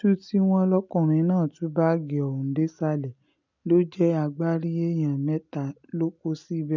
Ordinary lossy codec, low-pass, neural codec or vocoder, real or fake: none; 7.2 kHz; none; real